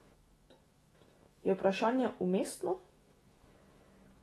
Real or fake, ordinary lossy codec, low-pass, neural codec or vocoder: fake; AAC, 32 kbps; 19.8 kHz; autoencoder, 48 kHz, 128 numbers a frame, DAC-VAE, trained on Japanese speech